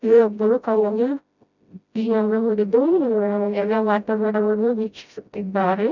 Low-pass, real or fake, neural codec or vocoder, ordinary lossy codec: 7.2 kHz; fake; codec, 16 kHz, 0.5 kbps, FreqCodec, smaller model; none